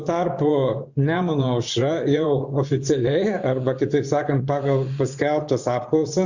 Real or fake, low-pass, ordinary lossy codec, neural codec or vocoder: fake; 7.2 kHz; Opus, 64 kbps; vocoder, 24 kHz, 100 mel bands, Vocos